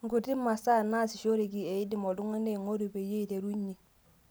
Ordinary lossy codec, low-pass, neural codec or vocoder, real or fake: none; none; none; real